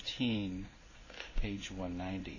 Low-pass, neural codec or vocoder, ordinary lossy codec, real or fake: 7.2 kHz; codec, 16 kHz in and 24 kHz out, 1 kbps, XY-Tokenizer; AAC, 32 kbps; fake